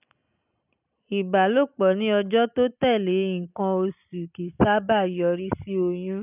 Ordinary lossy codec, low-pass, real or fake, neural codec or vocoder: none; 3.6 kHz; real; none